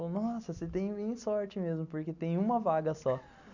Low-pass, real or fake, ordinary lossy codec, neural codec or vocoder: 7.2 kHz; real; none; none